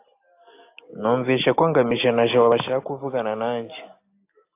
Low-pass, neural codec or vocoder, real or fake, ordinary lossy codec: 3.6 kHz; none; real; AAC, 24 kbps